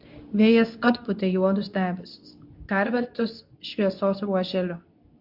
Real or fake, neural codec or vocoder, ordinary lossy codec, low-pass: fake; codec, 24 kHz, 0.9 kbps, WavTokenizer, medium speech release version 2; MP3, 48 kbps; 5.4 kHz